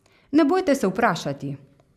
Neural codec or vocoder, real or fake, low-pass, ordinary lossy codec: none; real; 14.4 kHz; none